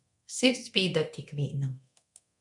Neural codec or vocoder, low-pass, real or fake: codec, 24 kHz, 0.9 kbps, DualCodec; 10.8 kHz; fake